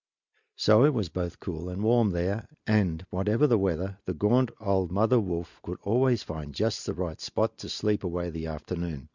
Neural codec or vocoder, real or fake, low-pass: none; real; 7.2 kHz